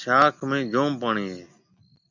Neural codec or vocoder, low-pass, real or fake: none; 7.2 kHz; real